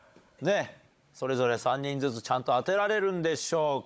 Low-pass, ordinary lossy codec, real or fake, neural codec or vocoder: none; none; fake; codec, 16 kHz, 16 kbps, FunCodec, trained on Chinese and English, 50 frames a second